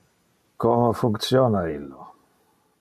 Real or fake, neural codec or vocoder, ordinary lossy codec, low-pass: real; none; MP3, 96 kbps; 14.4 kHz